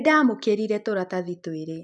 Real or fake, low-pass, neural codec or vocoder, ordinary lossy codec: real; 10.8 kHz; none; none